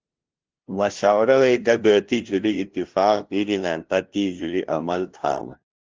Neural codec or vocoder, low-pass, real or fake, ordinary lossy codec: codec, 16 kHz, 0.5 kbps, FunCodec, trained on LibriTTS, 25 frames a second; 7.2 kHz; fake; Opus, 16 kbps